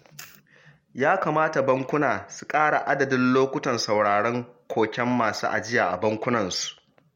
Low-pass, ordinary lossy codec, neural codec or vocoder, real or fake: 19.8 kHz; MP3, 64 kbps; none; real